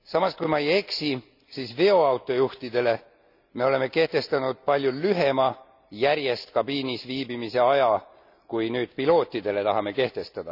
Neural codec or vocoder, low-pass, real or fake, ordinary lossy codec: none; 5.4 kHz; real; none